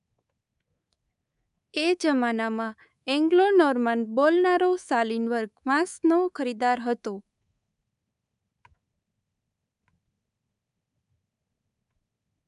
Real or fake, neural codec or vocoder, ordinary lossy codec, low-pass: fake; codec, 24 kHz, 3.1 kbps, DualCodec; none; 10.8 kHz